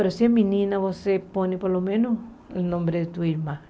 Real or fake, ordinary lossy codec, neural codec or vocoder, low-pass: real; none; none; none